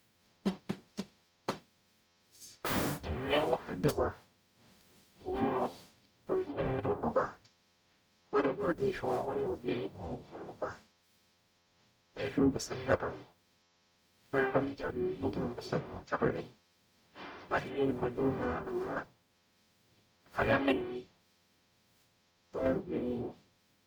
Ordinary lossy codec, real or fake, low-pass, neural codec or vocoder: none; fake; none; codec, 44.1 kHz, 0.9 kbps, DAC